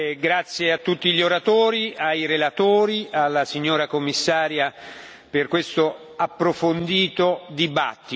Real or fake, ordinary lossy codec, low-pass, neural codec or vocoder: real; none; none; none